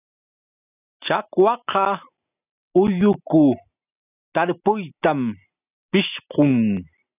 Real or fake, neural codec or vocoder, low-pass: real; none; 3.6 kHz